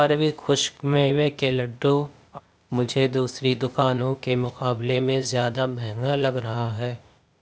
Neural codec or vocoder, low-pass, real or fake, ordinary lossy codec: codec, 16 kHz, 0.8 kbps, ZipCodec; none; fake; none